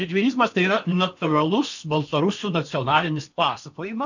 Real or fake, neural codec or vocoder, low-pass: fake; codec, 16 kHz in and 24 kHz out, 0.8 kbps, FocalCodec, streaming, 65536 codes; 7.2 kHz